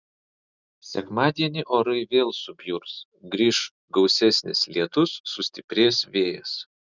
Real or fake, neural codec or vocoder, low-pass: real; none; 7.2 kHz